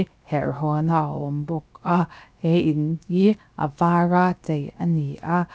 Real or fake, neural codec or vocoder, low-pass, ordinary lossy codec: fake; codec, 16 kHz, 0.7 kbps, FocalCodec; none; none